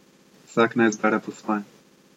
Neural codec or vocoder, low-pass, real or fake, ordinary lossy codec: none; 19.8 kHz; real; MP3, 64 kbps